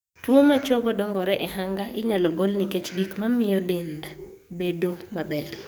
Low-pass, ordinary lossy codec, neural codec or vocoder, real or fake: none; none; codec, 44.1 kHz, 2.6 kbps, SNAC; fake